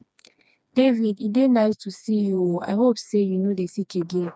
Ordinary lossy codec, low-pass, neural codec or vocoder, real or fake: none; none; codec, 16 kHz, 2 kbps, FreqCodec, smaller model; fake